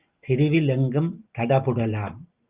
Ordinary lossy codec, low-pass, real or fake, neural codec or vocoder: Opus, 24 kbps; 3.6 kHz; real; none